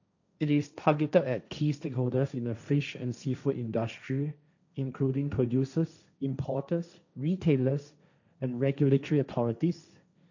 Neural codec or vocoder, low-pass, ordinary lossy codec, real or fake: codec, 16 kHz, 1.1 kbps, Voila-Tokenizer; 7.2 kHz; none; fake